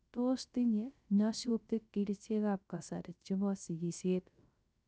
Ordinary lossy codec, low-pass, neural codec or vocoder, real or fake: none; none; codec, 16 kHz, 0.3 kbps, FocalCodec; fake